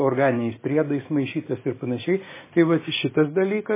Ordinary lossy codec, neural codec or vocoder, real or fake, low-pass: MP3, 16 kbps; none; real; 3.6 kHz